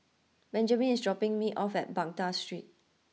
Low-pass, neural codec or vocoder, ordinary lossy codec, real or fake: none; none; none; real